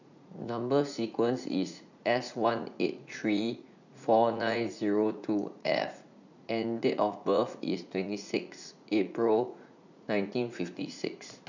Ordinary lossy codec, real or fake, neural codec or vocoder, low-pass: none; fake; vocoder, 44.1 kHz, 80 mel bands, Vocos; 7.2 kHz